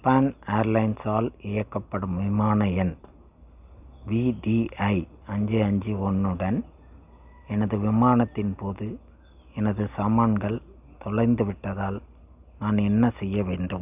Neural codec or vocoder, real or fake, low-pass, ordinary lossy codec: none; real; 3.6 kHz; none